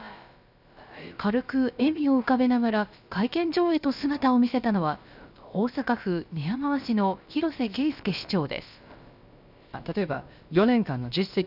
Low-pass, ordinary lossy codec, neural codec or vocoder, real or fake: 5.4 kHz; none; codec, 16 kHz, about 1 kbps, DyCAST, with the encoder's durations; fake